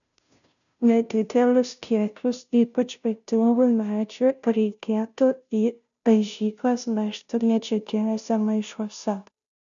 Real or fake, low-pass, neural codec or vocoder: fake; 7.2 kHz; codec, 16 kHz, 0.5 kbps, FunCodec, trained on Chinese and English, 25 frames a second